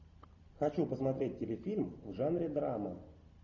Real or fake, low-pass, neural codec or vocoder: real; 7.2 kHz; none